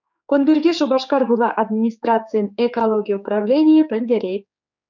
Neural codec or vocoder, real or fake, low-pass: codec, 16 kHz, 4 kbps, X-Codec, HuBERT features, trained on general audio; fake; 7.2 kHz